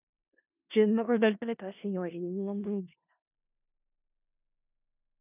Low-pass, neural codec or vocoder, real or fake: 3.6 kHz; codec, 16 kHz in and 24 kHz out, 0.4 kbps, LongCat-Audio-Codec, four codebook decoder; fake